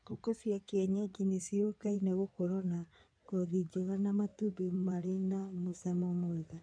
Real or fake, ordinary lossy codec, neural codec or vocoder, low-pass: fake; none; codec, 16 kHz in and 24 kHz out, 2.2 kbps, FireRedTTS-2 codec; 9.9 kHz